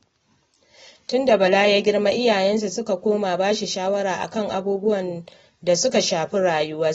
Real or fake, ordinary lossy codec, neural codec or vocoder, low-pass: real; AAC, 24 kbps; none; 19.8 kHz